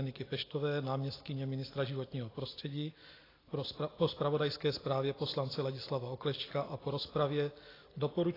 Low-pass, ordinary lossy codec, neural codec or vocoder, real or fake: 5.4 kHz; AAC, 24 kbps; none; real